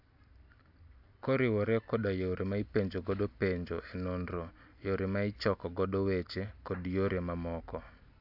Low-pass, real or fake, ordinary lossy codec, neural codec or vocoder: 5.4 kHz; real; AAC, 48 kbps; none